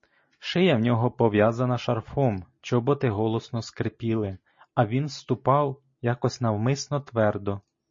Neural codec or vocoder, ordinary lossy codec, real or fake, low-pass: none; MP3, 32 kbps; real; 7.2 kHz